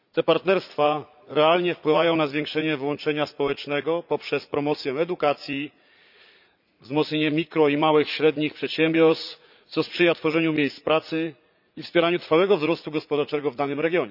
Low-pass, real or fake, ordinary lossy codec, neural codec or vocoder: 5.4 kHz; fake; none; vocoder, 44.1 kHz, 80 mel bands, Vocos